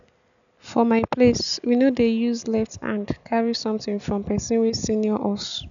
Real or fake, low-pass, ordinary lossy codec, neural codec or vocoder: real; 7.2 kHz; none; none